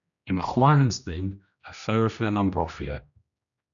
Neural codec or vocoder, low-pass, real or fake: codec, 16 kHz, 1 kbps, X-Codec, HuBERT features, trained on general audio; 7.2 kHz; fake